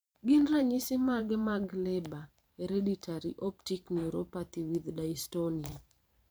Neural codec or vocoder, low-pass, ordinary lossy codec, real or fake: vocoder, 44.1 kHz, 128 mel bands every 512 samples, BigVGAN v2; none; none; fake